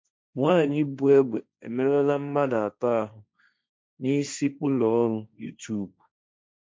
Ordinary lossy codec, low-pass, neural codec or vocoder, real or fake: none; none; codec, 16 kHz, 1.1 kbps, Voila-Tokenizer; fake